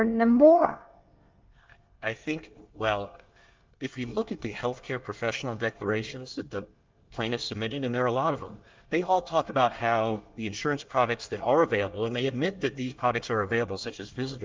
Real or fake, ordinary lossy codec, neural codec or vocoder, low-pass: fake; Opus, 32 kbps; codec, 24 kHz, 1 kbps, SNAC; 7.2 kHz